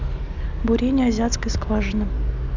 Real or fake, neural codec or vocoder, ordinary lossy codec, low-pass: real; none; none; 7.2 kHz